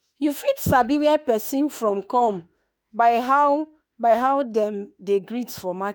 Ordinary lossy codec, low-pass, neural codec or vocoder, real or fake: none; none; autoencoder, 48 kHz, 32 numbers a frame, DAC-VAE, trained on Japanese speech; fake